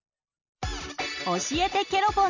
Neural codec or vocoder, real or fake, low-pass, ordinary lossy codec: none; real; 7.2 kHz; none